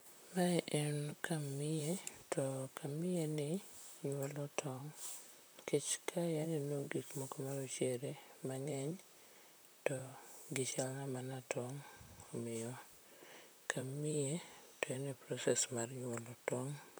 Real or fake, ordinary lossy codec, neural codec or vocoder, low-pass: fake; none; vocoder, 44.1 kHz, 128 mel bands, Pupu-Vocoder; none